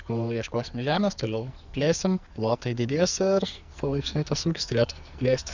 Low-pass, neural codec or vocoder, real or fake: 7.2 kHz; codec, 44.1 kHz, 2.6 kbps, SNAC; fake